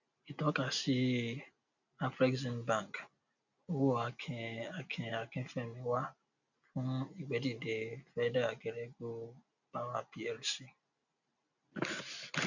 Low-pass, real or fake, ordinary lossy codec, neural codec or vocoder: 7.2 kHz; real; none; none